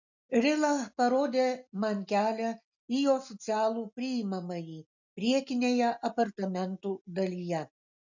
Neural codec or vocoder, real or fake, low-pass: none; real; 7.2 kHz